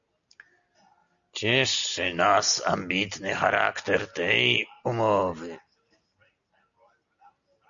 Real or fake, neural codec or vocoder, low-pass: real; none; 7.2 kHz